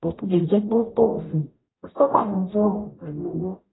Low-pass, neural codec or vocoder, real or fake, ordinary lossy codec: 7.2 kHz; codec, 44.1 kHz, 0.9 kbps, DAC; fake; AAC, 16 kbps